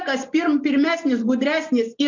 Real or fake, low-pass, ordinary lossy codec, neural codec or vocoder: real; 7.2 kHz; MP3, 48 kbps; none